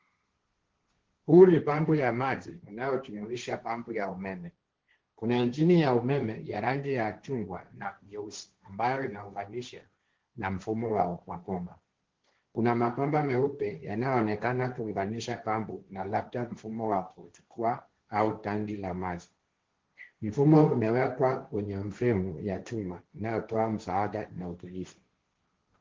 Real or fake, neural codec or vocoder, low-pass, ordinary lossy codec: fake; codec, 16 kHz, 1.1 kbps, Voila-Tokenizer; 7.2 kHz; Opus, 16 kbps